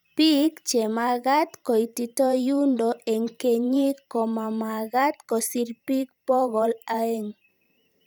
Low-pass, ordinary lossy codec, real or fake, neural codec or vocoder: none; none; fake; vocoder, 44.1 kHz, 128 mel bands every 512 samples, BigVGAN v2